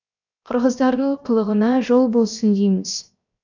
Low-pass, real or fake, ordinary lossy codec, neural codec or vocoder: 7.2 kHz; fake; none; codec, 16 kHz, 0.7 kbps, FocalCodec